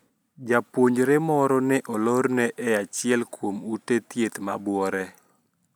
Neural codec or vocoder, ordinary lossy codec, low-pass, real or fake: none; none; none; real